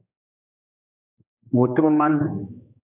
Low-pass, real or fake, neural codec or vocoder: 3.6 kHz; fake; codec, 16 kHz, 2 kbps, X-Codec, HuBERT features, trained on general audio